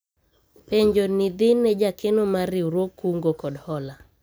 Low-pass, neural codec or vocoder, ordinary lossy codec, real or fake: none; none; none; real